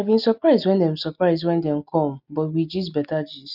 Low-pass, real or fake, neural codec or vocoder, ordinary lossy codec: 5.4 kHz; real; none; none